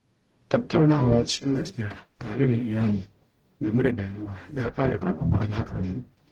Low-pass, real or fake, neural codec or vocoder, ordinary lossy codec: 19.8 kHz; fake; codec, 44.1 kHz, 0.9 kbps, DAC; Opus, 16 kbps